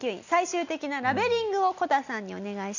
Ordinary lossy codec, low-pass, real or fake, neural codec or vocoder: none; 7.2 kHz; real; none